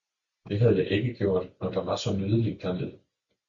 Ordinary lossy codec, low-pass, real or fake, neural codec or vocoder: Opus, 64 kbps; 7.2 kHz; real; none